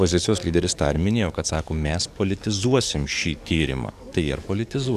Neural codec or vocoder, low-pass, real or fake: codec, 44.1 kHz, 7.8 kbps, DAC; 14.4 kHz; fake